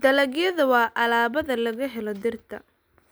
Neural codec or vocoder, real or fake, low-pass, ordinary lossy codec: none; real; none; none